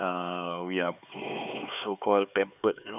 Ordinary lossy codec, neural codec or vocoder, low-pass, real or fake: none; codec, 16 kHz, 4 kbps, X-Codec, HuBERT features, trained on LibriSpeech; 3.6 kHz; fake